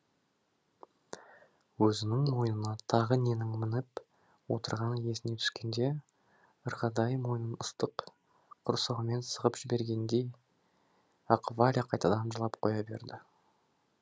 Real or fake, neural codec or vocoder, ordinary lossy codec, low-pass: real; none; none; none